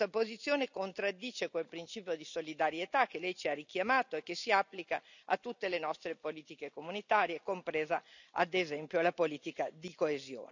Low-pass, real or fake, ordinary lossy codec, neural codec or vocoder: 7.2 kHz; real; none; none